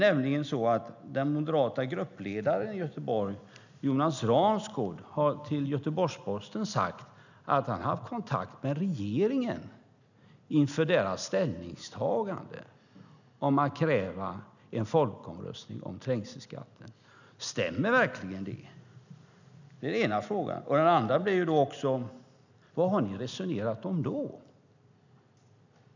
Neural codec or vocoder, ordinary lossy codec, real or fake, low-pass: none; none; real; 7.2 kHz